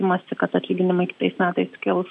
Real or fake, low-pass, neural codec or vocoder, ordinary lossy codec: real; 10.8 kHz; none; MP3, 64 kbps